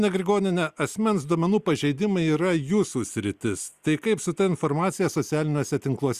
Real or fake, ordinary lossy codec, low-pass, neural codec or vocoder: real; Opus, 64 kbps; 14.4 kHz; none